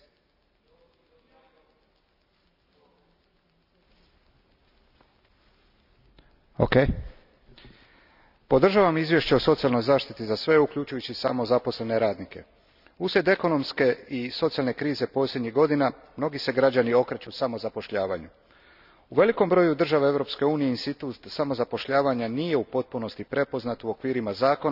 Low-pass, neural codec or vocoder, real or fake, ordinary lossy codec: 5.4 kHz; none; real; none